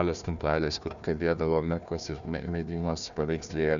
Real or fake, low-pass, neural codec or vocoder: fake; 7.2 kHz; codec, 16 kHz, 1 kbps, FunCodec, trained on Chinese and English, 50 frames a second